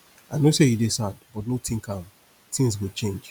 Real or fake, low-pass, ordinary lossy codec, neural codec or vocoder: real; none; none; none